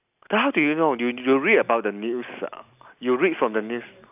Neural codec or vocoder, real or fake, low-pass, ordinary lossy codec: none; real; 3.6 kHz; AAC, 32 kbps